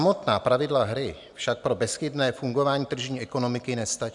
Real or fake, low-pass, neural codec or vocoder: real; 10.8 kHz; none